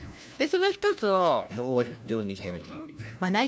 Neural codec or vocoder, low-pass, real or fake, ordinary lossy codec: codec, 16 kHz, 1 kbps, FunCodec, trained on LibriTTS, 50 frames a second; none; fake; none